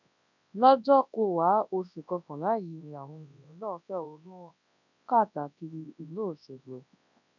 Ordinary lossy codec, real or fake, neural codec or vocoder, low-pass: none; fake; codec, 24 kHz, 0.9 kbps, WavTokenizer, large speech release; 7.2 kHz